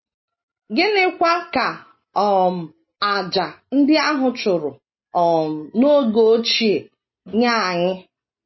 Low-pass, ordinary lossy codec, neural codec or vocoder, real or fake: 7.2 kHz; MP3, 24 kbps; none; real